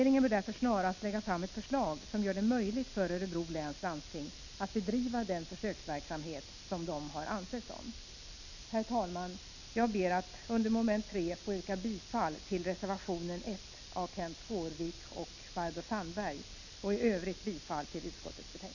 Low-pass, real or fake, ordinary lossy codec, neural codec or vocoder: 7.2 kHz; real; none; none